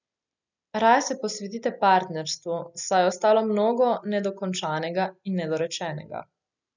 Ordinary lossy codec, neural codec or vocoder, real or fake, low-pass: none; none; real; 7.2 kHz